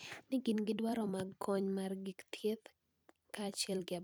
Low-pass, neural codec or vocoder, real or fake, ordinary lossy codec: none; vocoder, 44.1 kHz, 128 mel bands every 256 samples, BigVGAN v2; fake; none